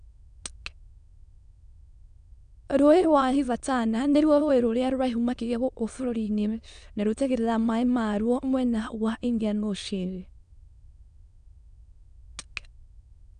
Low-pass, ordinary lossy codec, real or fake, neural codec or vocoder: 9.9 kHz; none; fake; autoencoder, 22.05 kHz, a latent of 192 numbers a frame, VITS, trained on many speakers